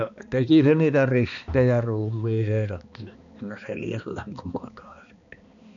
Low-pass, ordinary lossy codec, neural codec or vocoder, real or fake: 7.2 kHz; none; codec, 16 kHz, 2 kbps, X-Codec, HuBERT features, trained on balanced general audio; fake